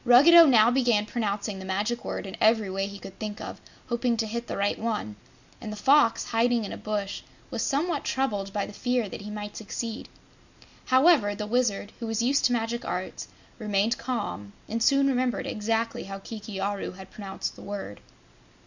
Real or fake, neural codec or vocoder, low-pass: real; none; 7.2 kHz